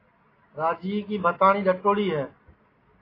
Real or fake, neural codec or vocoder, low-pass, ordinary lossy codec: real; none; 5.4 kHz; AAC, 24 kbps